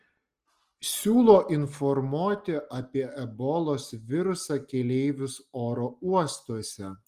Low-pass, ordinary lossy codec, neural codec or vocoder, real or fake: 14.4 kHz; Opus, 24 kbps; none; real